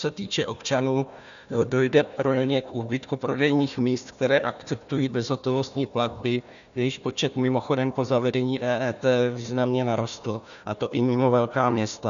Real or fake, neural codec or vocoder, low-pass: fake; codec, 16 kHz, 1 kbps, FunCodec, trained on Chinese and English, 50 frames a second; 7.2 kHz